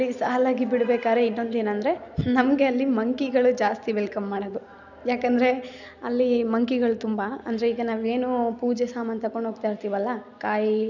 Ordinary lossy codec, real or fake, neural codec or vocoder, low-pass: none; real; none; 7.2 kHz